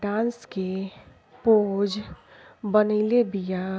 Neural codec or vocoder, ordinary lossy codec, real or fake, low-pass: none; none; real; none